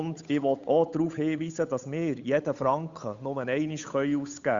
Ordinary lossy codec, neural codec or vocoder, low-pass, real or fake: Opus, 64 kbps; codec, 16 kHz, 8 kbps, FunCodec, trained on Chinese and English, 25 frames a second; 7.2 kHz; fake